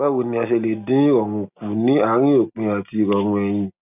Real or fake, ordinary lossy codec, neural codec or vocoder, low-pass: real; none; none; 3.6 kHz